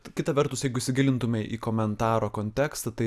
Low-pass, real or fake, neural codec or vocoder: 14.4 kHz; real; none